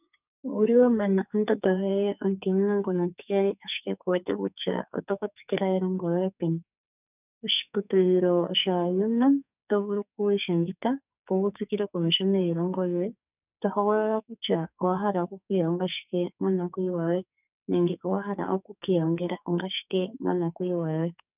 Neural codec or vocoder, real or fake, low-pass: codec, 44.1 kHz, 2.6 kbps, SNAC; fake; 3.6 kHz